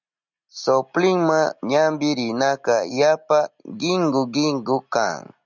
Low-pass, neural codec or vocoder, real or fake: 7.2 kHz; none; real